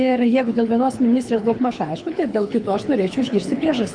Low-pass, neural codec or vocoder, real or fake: 9.9 kHz; codec, 24 kHz, 6 kbps, HILCodec; fake